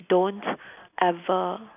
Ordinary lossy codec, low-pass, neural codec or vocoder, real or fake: none; 3.6 kHz; none; real